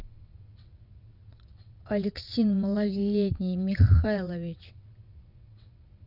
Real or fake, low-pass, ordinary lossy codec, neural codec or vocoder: fake; 5.4 kHz; none; vocoder, 22.05 kHz, 80 mel bands, WaveNeXt